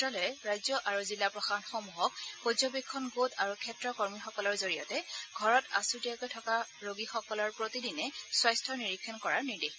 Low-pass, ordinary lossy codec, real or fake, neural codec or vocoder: none; none; real; none